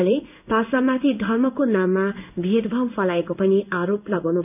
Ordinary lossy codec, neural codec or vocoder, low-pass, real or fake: none; codec, 16 kHz in and 24 kHz out, 1 kbps, XY-Tokenizer; 3.6 kHz; fake